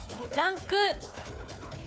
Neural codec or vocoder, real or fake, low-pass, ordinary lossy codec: codec, 16 kHz, 4 kbps, FunCodec, trained on Chinese and English, 50 frames a second; fake; none; none